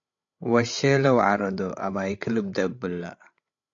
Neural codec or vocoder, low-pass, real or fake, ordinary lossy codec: codec, 16 kHz, 16 kbps, FreqCodec, larger model; 7.2 kHz; fake; AAC, 48 kbps